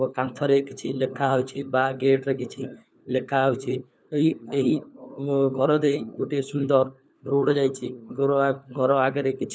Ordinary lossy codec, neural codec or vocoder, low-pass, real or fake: none; codec, 16 kHz, 4 kbps, FunCodec, trained on LibriTTS, 50 frames a second; none; fake